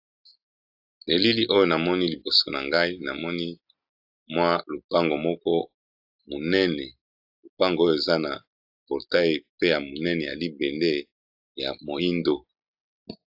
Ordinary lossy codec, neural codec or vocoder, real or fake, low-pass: AAC, 48 kbps; none; real; 5.4 kHz